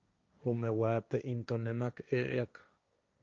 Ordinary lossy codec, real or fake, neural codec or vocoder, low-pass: Opus, 24 kbps; fake; codec, 16 kHz, 1.1 kbps, Voila-Tokenizer; 7.2 kHz